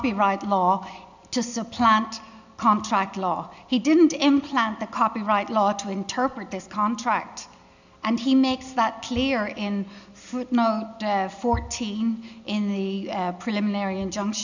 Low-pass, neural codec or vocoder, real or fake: 7.2 kHz; none; real